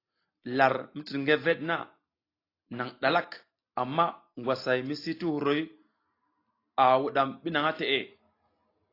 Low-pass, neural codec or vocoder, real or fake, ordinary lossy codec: 5.4 kHz; none; real; AAC, 32 kbps